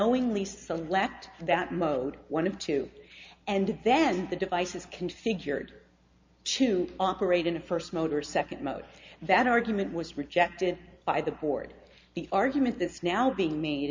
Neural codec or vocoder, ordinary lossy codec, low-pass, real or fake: none; MP3, 64 kbps; 7.2 kHz; real